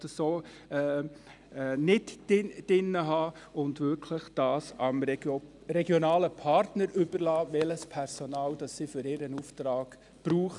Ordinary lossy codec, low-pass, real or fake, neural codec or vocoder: none; 10.8 kHz; real; none